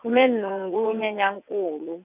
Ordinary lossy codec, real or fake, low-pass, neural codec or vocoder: none; fake; 3.6 kHz; vocoder, 22.05 kHz, 80 mel bands, WaveNeXt